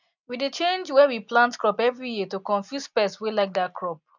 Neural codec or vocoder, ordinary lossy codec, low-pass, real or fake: none; none; 7.2 kHz; real